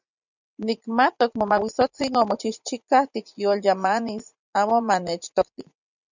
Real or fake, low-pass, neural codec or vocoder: fake; 7.2 kHz; vocoder, 44.1 kHz, 80 mel bands, Vocos